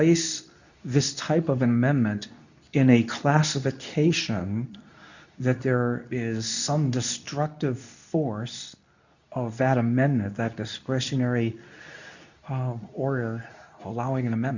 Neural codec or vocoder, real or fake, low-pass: codec, 24 kHz, 0.9 kbps, WavTokenizer, medium speech release version 2; fake; 7.2 kHz